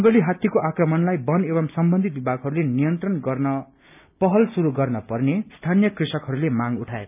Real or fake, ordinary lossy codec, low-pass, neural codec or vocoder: real; none; 3.6 kHz; none